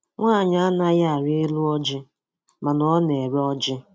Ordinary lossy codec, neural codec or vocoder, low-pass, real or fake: none; none; none; real